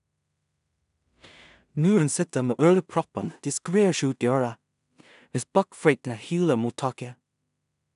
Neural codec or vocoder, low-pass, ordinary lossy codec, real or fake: codec, 16 kHz in and 24 kHz out, 0.4 kbps, LongCat-Audio-Codec, two codebook decoder; 10.8 kHz; none; fake